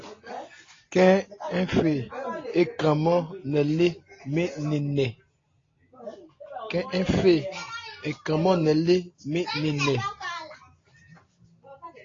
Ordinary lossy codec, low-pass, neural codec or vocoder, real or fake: AAC, 32 kbps; 7.2 kHz; none; real